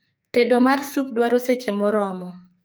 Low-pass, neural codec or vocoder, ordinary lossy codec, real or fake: none; codec, 44.1 kHz, 2.6 kbps, SNAC; none; fake